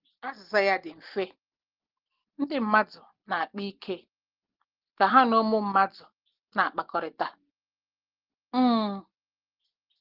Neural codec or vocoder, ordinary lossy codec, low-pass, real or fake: none; Opus, 16 kbps; 5.4 kHz; real